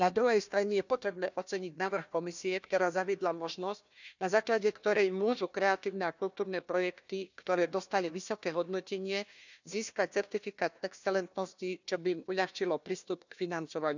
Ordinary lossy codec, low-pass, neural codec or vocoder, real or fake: none; 7.2 kHz; codec, 16 kHz, 1 kbps, FunCodec, trained on Chinese and English, 50 frames a second; fake